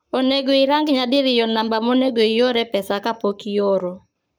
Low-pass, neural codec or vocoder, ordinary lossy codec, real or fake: none; codec, 44.1 kHz, 7.8 kbps, Pupu-Codec; none; fake